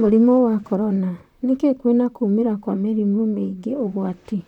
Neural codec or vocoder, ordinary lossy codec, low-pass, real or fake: vocoder, 44.1 kHz, 128 mel bands, Pupu-Vocoder; none; 19.8 kHz; fake